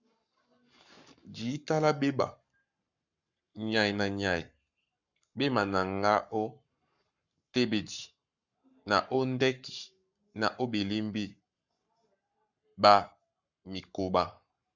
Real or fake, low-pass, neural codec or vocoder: fake; 7.2 kHz; codec, 44.1 kHz, 7.8 kbps, Pupu-Codec